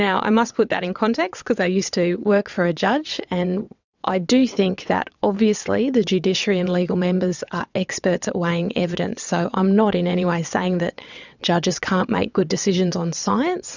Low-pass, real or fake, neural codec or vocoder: 7.2 kHz; fake; vocoder, 22.05 kHz, 80 mel bands, WaveNeXt